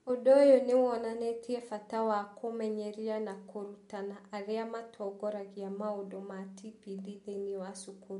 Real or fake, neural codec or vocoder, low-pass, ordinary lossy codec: real; none; 10.8 kHz; MP3, 64 kbps